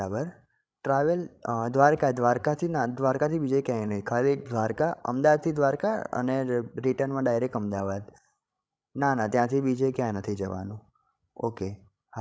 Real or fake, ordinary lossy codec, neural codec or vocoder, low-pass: fake; none; codec, 16 kHz, 16 kbps, FreqCodec, larger model; none